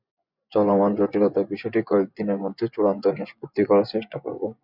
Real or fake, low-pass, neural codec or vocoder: fake; 5.4 kHz; vocoder, 24 kHz, 100 mel bands, Vocos